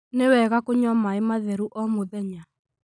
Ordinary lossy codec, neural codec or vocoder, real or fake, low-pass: none; none; real; 9.9 kHz